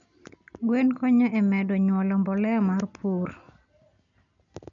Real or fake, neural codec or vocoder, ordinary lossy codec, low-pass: real; none; none; 7.2 kHz